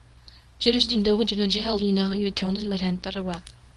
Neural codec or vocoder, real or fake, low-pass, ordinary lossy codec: codec, 24 kHz, 0.9 kbps, WavTokenizer, small release; fake; 10.8 kHz; Opus, 24 kbps